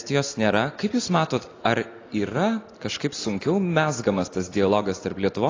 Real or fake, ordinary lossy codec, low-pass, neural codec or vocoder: real; AAC, 32 kbps; 7.2 kHz; none